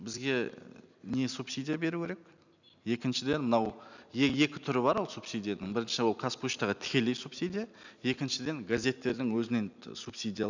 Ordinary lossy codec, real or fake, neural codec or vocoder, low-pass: none; real; none; 7.2 kHz